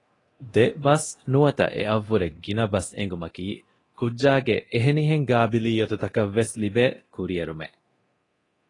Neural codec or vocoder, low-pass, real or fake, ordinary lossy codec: codec, 24 kHz, 0.9 kbps, DualCodec; 10.8 kHz; fake; AAC, 32 kbps